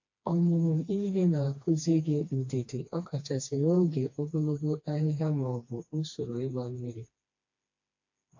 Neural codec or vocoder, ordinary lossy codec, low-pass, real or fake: codec, 16 kHz, 2 kbps, FreqCodec, smaller model; AAC, 48 kbps; 7.2 kHz; fake